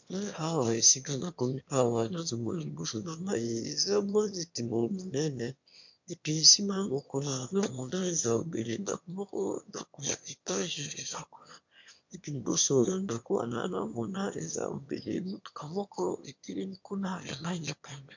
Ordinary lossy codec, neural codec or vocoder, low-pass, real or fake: MP3, 64 kbps; autoencoder, 22.05 kHz, a latent of 192 numbers a frame, VITS, trained on one speaker; 7.2 kHz; fake